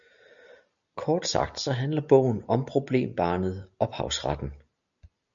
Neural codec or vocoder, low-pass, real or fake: none; 7.2 kHz; real